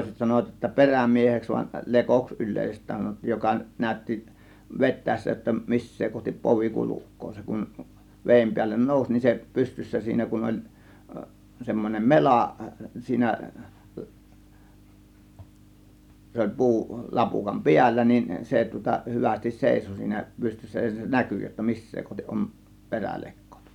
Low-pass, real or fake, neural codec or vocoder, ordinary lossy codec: 19.8 kHz; real; none; none